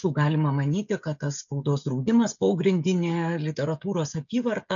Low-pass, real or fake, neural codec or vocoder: 7.2 kHz; fake; codec, 16 kHz, 16 kbps, FreqCodec, smaller model